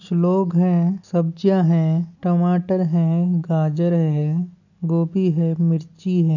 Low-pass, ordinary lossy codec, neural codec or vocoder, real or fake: 7.2 kHz; none; none; real